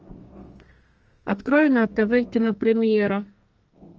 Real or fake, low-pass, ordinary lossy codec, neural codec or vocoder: fake; 7.2 kHz; Opus, 24 kbps; codec, 32 kHz, 1.9 kbps, SNAC